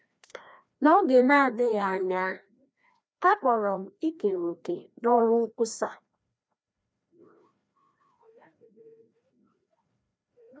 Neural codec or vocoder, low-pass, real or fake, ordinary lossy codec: codec, 16 kHz, 1 kbps, FreqCodec, larger model; none; fake; none